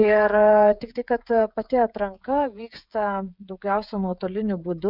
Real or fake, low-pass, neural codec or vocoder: fake; 5.4 kHz; codec, 16 kHz, 16 kbps, FreqCodec, smaller model